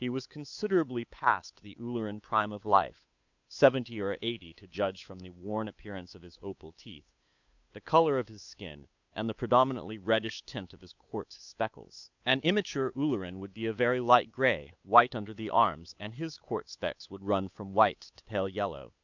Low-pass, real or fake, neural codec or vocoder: 7.2 kHz; fake; codec, 16 kHz, 8 kbps, FunCodec, trained on Chinese and English, 25 frames a second